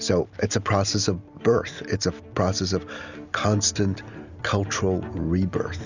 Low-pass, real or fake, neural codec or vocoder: 7.2 kHz; real; none